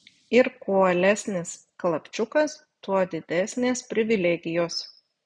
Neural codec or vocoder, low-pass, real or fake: none; 9.9 kHz; real